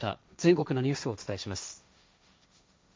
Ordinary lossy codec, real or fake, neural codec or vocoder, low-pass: none; fake; codec, 16 kHz, 1.1 kbps, Voila-Tokenizer; none